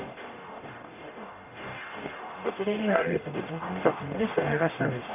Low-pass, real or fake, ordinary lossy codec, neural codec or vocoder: 3.6 kHz; fake; none; codec, 44.1 kHz, 0.9 kbps, DAC